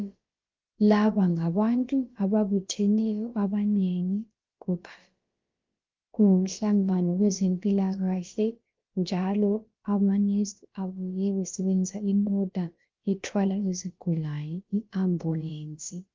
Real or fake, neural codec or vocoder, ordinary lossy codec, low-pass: fake; codec, 16 kHz, about 1 kbps, DyCAST, with the encoder's durations; Opus, 24 kbps; 7.2 kHz